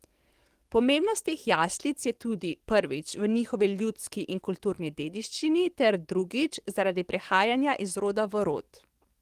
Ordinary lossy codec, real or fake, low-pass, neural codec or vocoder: Opus, 24 kbps; fake; 14.4 kHz; codec, 44.1 kHz, 7.8 kbps, DAC